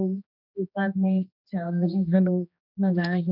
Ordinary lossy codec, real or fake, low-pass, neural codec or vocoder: none; fake; 5.4 kHz; codec, 16 kHz, 1 kbps, X-Codec, HuBERT features, trained on general audio